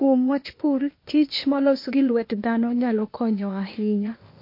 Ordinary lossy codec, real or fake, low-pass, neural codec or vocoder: MP3, 32 kbps; fake; 5.4 kHz; codec, 16 kHz, 0.8 kbps, ZipCodec